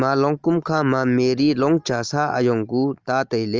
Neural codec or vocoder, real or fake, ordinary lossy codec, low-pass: none; real; Opus, 24 kbps; 7.2 kHz